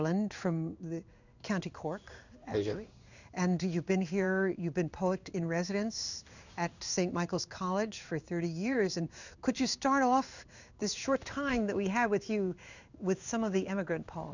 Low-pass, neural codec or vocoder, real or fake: 7.2 kHz; codec, 16 kHz in and 24 kHz out, 1 kbps, XY-Tokenizer; fake